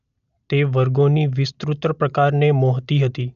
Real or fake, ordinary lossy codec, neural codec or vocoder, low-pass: real; none; none; 7.2 kHz